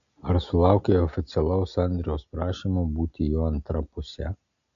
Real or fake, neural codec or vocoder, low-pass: real; none; 7.2 kHz